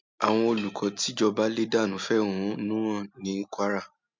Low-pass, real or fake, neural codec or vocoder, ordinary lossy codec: 7.2 kHz; real; none; MP3, 64 kbps